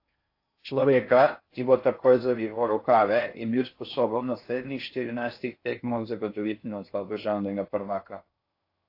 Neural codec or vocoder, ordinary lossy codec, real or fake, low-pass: codec, 16 kHz in and 24 kHz out, 0.6 kbps, FocalCodec, streaming, 4096 codes; AAC, 32 kbps; fake; 5.4 kHz